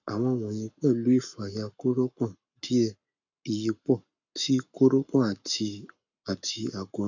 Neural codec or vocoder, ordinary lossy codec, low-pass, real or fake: codec, 44.1 kHz, 7.8 kbps, Pupu-Codec; none; 7.2 kHz; fake